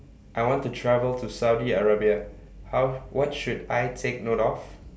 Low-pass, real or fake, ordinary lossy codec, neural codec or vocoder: none; real; none; none